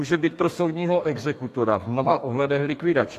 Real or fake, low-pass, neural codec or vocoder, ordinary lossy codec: fake; 14.4 kHz; codec, 32 kHz, 1.9 kbps, SNAC; AAC, 64 kbps